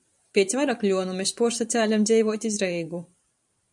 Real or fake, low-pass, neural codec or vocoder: fake; 10.8 kHz; vocoder, 44.1 kHz, 128 mel bands every 512 samples, BigVGAN v2